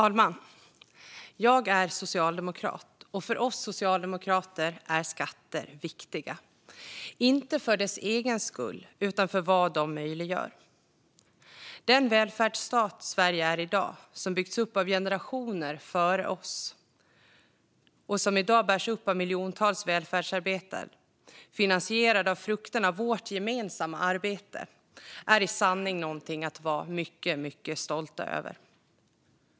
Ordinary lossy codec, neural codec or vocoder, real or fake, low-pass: none; none; real; none